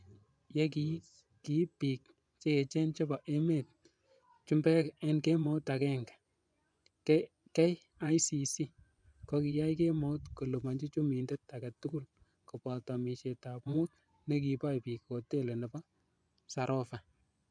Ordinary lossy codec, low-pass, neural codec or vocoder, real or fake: none; 9.9 kHz; vocoder, 24 kHz, 100 mel bands, Vocos; fake